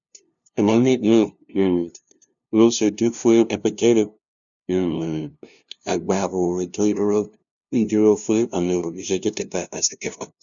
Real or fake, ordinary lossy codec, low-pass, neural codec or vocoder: fake; none; 7.2 kHz; codec, 16 kHz, 0.5 kbps, FunCodec, trained on LibriTTS, 25 frames a second